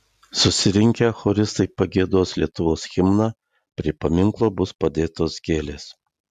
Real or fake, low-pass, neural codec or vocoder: real; 14.4 kHz; none